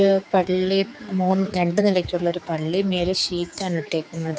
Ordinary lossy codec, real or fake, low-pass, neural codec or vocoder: none; fake; none; codec, 16 kHz, 4 kbps, X-Codec, HuBERT features, trained on general audio